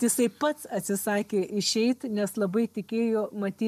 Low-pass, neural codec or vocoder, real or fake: 14.4 kHz; none; real